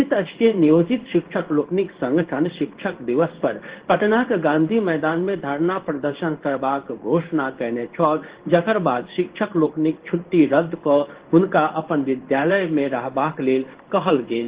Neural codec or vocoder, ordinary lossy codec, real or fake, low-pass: codec, 16 kHz in and 24 kHz out, 1 kbps, XY-Tokenizer; Opus, 16 kbps; fake; 3.6 kHz